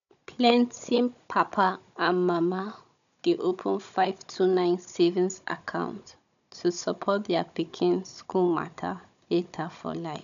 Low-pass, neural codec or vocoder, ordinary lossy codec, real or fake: 7.2 kHz; codec, 16 kHz, 16 kbps, FunCodec, trained on Chinese and English, 50 frames a second; none; fake